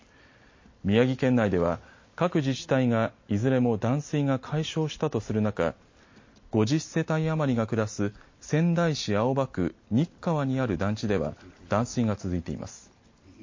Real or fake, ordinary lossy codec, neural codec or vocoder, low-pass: real; MP3, 32 kbps; none; 7.2 kHz